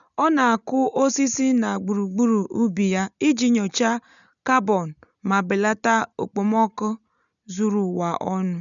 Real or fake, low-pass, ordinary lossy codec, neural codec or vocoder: real; 7.2 kHz; none; none